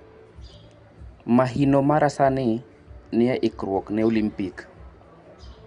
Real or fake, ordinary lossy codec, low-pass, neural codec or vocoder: real; Opus, 64 kbps; 9.9 kHz; none